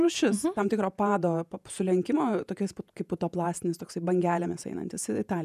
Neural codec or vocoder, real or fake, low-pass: vocoder, 48 kHz, 128 mel bands, Vocos; fake; 14.4 kHz